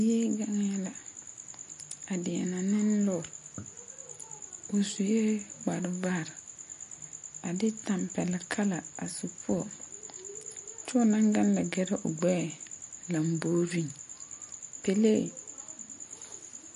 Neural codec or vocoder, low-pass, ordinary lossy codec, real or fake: none; 10.8 kHz; MP3, 48 kbps; real